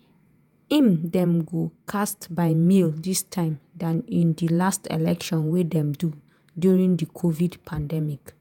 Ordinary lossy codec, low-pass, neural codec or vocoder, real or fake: none; none; vocoder, 48 kHz, 128 mel bands, Vocos; fake